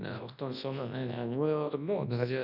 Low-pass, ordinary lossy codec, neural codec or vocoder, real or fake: 5.4 kHz; none; codec, 24 kHz, 0.9 kbps, WavTokenizer, large speech release; fake